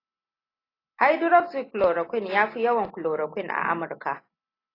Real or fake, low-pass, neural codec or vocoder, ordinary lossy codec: real; 5.4 kHz; none; AAC, 24 kbps